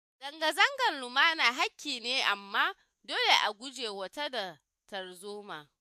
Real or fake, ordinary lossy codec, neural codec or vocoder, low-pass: fake; MP3, 64 kbps; autoencoder, 48 kHz, 128 numbers a frame, DAC-VAE, trained on Japanese speech; 14.4 kHz